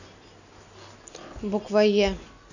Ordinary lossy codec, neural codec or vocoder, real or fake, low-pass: none; none; real; 7.2 kHz